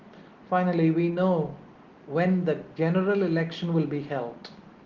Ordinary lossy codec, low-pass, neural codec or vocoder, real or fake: Opus, 16 kbps; 7.2 kHz; none; real